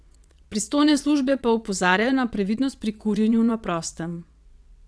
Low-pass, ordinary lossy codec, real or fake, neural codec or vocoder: none; none; fake; vocoder, 22.05 kHz, 80 mel bands, WaveNeXt